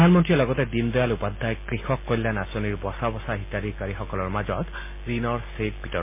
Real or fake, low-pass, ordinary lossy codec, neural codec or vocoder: real; 3.6 kHz; MP3, 24 kbps; none